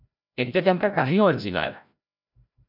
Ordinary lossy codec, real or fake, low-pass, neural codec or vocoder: MP3, 48 kbps; fake; 5.4 kHz; codec, 16 kHz, 0.5 kbps, FreqCodec, larger model